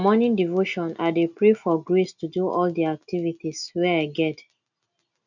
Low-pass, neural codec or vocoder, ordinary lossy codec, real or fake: 7.2 kHz; none; none; real